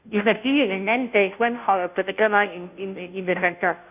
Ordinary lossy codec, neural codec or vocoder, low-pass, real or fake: none; codec, 16 kHz, 0.5 kbps, FunCodec, trained on Chinese and English, 25 frames a second; 3.6 kHz; fake